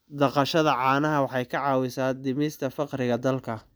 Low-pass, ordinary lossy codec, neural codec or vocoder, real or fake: none; none; none; real